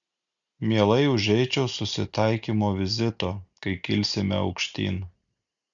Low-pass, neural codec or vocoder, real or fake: 7.2 kHz; none; real